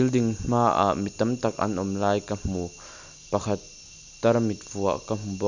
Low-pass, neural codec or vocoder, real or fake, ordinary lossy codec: 7.2 kHz; none; real; none